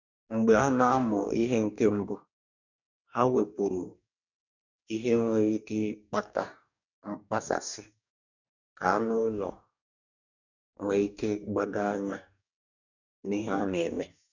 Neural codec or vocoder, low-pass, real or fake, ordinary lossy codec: codec, 44.1 kHz, 2.6 kbps, DAC; 7.2 kHz; fake; none